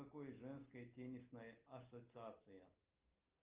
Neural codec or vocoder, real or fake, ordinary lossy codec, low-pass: none; real; Opus, 24 kbps; 3.6 kHz